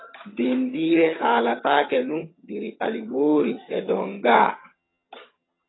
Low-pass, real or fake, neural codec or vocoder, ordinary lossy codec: 7.2 kHz; fake; vocoder, 22.05 kHz, 80 mel bands, HiFi-GAN; AAC, 16 kbps